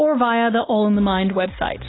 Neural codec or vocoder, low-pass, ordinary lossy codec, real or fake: none; 7.2 kHz; AAC, 16 kbps; real